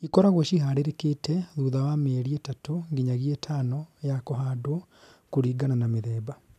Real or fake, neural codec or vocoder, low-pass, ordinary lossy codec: real; none; 14.4 kHz; none